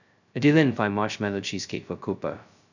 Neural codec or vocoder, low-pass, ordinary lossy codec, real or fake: codec, 16 kHz, 0.2 kbps, FocalCodec; 7.2 kHz; none; fake